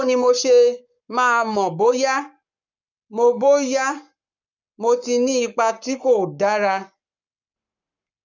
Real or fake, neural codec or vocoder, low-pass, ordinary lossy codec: fake; codec, 44.1 kHz, 7.8 kbps, Pupu-Codec; 7.2 kHz; none